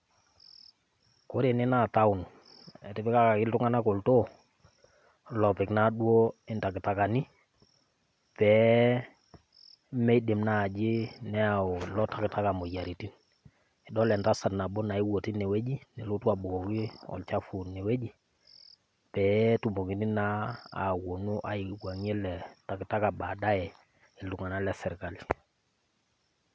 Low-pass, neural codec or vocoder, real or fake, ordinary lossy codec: none; none; real; none